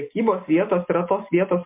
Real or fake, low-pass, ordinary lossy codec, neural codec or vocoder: real; 3.6 kHz; MP3, 24 kbps; none